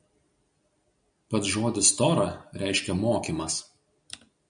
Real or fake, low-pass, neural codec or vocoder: real; 9.9 kHz; none